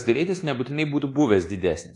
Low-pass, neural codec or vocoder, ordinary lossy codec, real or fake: 10.8 kHz; codec, 24 kHz, 1.2 kbps, DualCodec; AAC, 48 kbps; fake